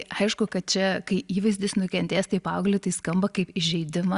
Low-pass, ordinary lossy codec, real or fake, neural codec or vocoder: 10.8 kHz; Opus, 64 kbps; real; none